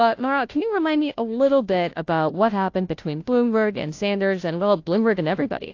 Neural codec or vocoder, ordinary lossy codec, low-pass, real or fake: codec, 16 kHz, 0.5 kbps, FunCodec, trained on Chinese and English, 25 frames a second; AAC, 48 kbps; 7.2 kHz; fake